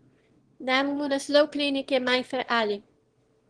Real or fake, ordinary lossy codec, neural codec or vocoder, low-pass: fake; Opus, 16 kbps; autoencoder, 22.05 kHz, a latent of 192 numbers a frame, VITS, trained on one speaker; 9.9 kHz